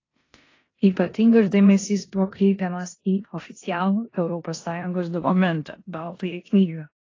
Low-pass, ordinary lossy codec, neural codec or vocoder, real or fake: 7.2 kHz; AAC, 32 kbps; codec, 16 kHz in and 24 kHz out, 0.9 kbps, LongCat-Audio-Codec, four codebook decoder; fake